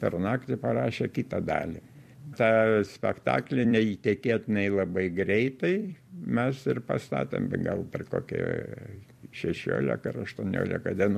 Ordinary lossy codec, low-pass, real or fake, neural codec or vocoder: MP3, 64 kbps; 14.4 kHz; fake; vocoder, 44.1 kHz, 128 mel bands every 256 samples, BigVGAN v2